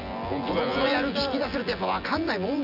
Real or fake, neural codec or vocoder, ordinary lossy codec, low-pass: fake; vocoder, 24 kHz, 100 mel bands, Vocos; none; 5.4 kHz